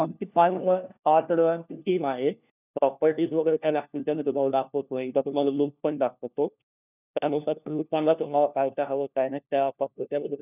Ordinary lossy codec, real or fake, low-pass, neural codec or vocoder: none; fake; 3.6 kHz; codec, 16 kHz, 1 kbps, FunCodec, trained on LibriTTS, 50 frames a second